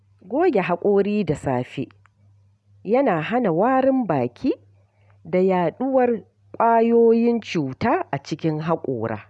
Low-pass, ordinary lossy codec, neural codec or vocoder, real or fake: none; none; none; real